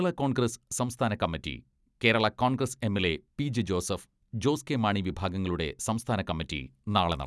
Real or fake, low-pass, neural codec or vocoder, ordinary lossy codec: real; none; none; none